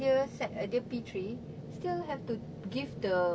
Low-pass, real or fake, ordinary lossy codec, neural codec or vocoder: none; real; none; none